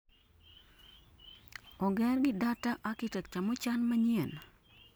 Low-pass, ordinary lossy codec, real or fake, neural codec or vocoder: none; none; real; none